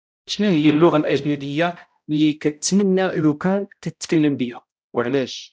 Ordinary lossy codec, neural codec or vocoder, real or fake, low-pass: none; codec, 16 kHz, 0.5 kbps, X-Codec, HuBERT features, trained on balanced general audio; fake; none